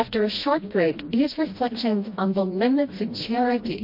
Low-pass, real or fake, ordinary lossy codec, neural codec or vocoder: 5.4 kHz; fake; MP3, 32 kbps; codec, 16 kHz, 1 kbps, FreqCodec, smaller model